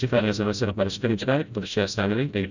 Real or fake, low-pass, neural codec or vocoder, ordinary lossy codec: fake; 7.2 kHz; codec, 16 kHz, 0.5 kbps, FreqCodec, smaller model; none